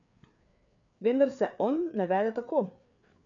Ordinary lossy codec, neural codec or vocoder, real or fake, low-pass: none; codec, 16 kHz, 4 kbps, FreqCodec, larger model; fake; 7.2 kHz